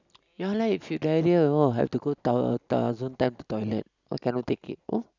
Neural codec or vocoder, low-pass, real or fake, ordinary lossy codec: none; 7.2 kHz; real; none